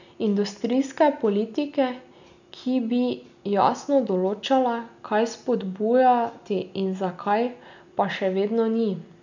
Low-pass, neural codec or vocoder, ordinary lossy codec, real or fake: 7.2 kHz; none; none; real